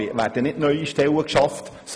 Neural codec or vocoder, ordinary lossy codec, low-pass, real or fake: none; none; none; real